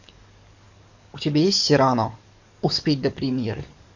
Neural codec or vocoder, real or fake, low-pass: codec, 44.1 kHz, 7.8 kbps, DAC; fake; 7.2 kHz